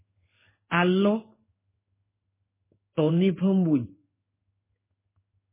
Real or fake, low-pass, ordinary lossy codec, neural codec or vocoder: fake; 3.6 kHz; MP3, 16 kbps; codec, 16 kHz in and 24 kHz out, 1 kbps, XY-Tokenizer